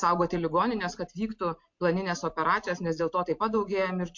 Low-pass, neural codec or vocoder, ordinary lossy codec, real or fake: 7.2 kHz; none; MP3, 48 kbps; real